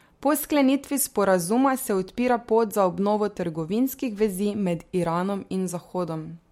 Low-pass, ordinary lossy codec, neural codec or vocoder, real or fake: 19.8 kHz; MP3, 64 kbps; none; real